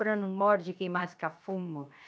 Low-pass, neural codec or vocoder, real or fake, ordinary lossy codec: none; codec, 16 kHz, 0.7 kbps, FocalCodec; fake; none